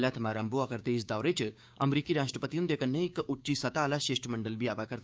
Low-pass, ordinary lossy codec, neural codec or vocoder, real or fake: none; none; codec, 16 kHz, 6 kbps, DAC; fake